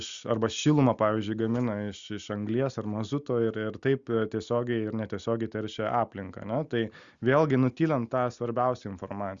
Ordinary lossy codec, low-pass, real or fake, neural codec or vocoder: Opus, 64 kbps; 7.2 kHz; real; none